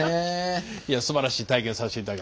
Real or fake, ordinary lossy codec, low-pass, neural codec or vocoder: real; none; none; none